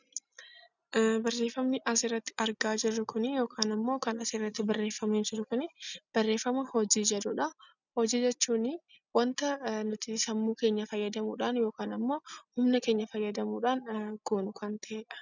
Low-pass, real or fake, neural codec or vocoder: 7.2 kHz; real; none